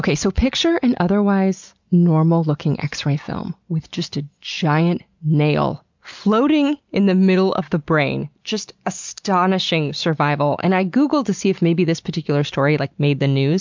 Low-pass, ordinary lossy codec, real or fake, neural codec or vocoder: 7.2 kHz; MP3, 64 kbps; real; none